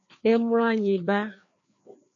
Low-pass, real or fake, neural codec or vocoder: 7.2 kHz; fake; codec, 16 kHz, 2 kbps, FreqCodec, larger model